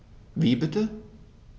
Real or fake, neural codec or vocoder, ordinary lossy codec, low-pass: real; none; none; none